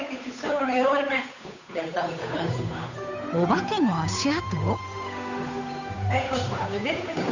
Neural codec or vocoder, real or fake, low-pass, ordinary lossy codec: codec, 16 kHz, 8 kbps, FunCodec, trained on Chinese and English, 25 frames a second; fake; 7.2 kHz; none